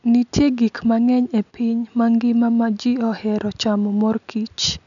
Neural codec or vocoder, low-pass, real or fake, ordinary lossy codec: none; 7.2 kHz; real; none